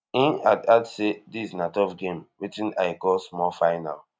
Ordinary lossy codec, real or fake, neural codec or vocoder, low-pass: none; real; none; none